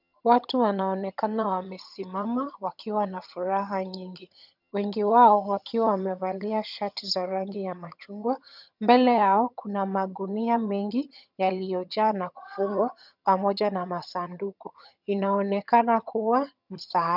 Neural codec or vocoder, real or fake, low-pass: vocoder, 22.05 kHz, 80 mel bands, HiFi-GAN; fake; 5.4 kHz